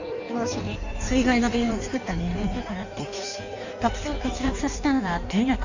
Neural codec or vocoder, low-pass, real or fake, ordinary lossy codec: codec, 16 kHz in and 24 kHz out, 1.1 kbps, FireRedTTS-2 codec; 7.2 kHz; fake; none